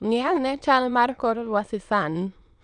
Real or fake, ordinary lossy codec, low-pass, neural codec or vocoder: fake; none; 9.9 kHz; autoencoder, 22.05 kHz, a latent of 192 numbers a frame, VITS, trained on many speakers